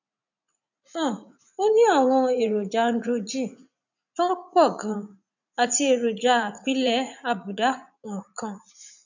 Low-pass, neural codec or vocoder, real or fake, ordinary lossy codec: 7.2 kHz; vocoder, 44.1 kHz, 80 mel bands, Vocos; fake; none